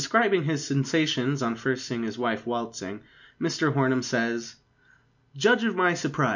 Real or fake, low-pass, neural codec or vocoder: real; 7.2 kHz; none